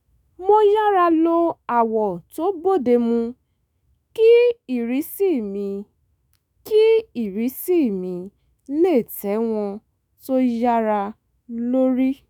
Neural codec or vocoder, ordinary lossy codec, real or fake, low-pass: autoencoder, 48 kHz, 128 numbers a frame, DAC-VAE, trained on Japanese speech; none; fake; none